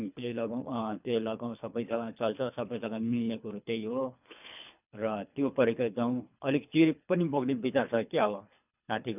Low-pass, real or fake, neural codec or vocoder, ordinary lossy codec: 3.6 kHz; fake; codec, 24 kHz, 3 kbps, HILCodec; none